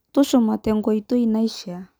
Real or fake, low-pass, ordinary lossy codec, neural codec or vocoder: real; none; none; none